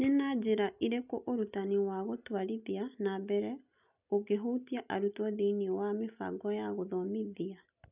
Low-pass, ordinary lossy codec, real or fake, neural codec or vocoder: 3.6 kHz; none; real; none